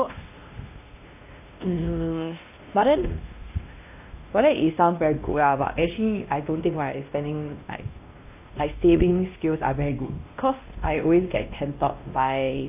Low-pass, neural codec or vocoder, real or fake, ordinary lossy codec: 3.6 kHz; codec, 16 kHz, 1 kbps, X-Codec, WavLM features, trained on Multilingual LibriSpeech; fake; AAC, 32 kbps